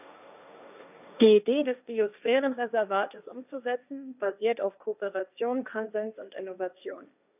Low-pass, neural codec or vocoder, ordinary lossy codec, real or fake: 3.6 kHz; codec, 16 kHz, 1.1 kbps, Voila-Tokenizer; none; fake